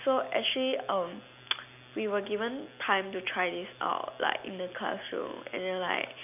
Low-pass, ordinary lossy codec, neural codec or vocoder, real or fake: 3.6 kHz; none; none; real